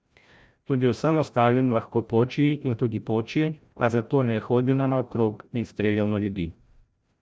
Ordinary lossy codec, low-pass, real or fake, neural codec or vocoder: none; none; fake; codec, 16 kHz, 0.5 kbps, FreqCodec, larger model